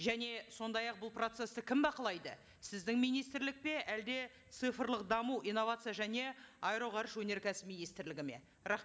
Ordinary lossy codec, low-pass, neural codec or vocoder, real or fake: none; none; none; real